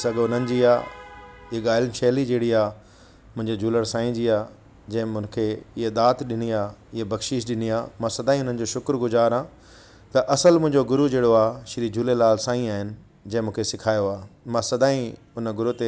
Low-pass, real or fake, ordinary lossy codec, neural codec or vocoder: none; real; none; none